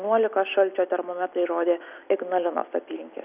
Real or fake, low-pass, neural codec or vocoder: real; 3.6 kHz; none